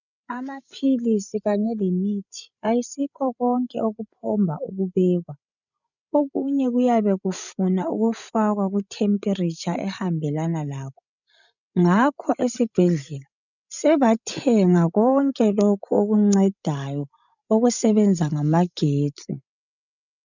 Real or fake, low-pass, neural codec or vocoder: fake; 7.2 kHz; codec, 16 kHz, 16 kbps, FreqCodec, larger model